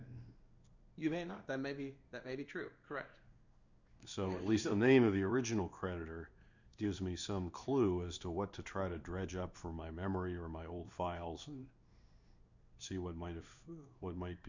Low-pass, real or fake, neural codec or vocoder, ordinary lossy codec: 7.2 kHz; fake; codec, 16 kHz in and 24 kHz out, 1 kbps, XY-Tokenizer; Opus, 64 kbps